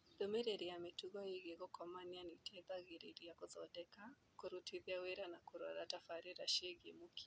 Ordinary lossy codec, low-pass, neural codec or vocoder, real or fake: none; none; none; real